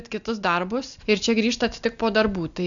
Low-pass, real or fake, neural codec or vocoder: 7.2 kHz; real; none